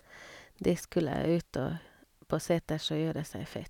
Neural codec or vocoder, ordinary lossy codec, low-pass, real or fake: none; none; 19.8 kHz; real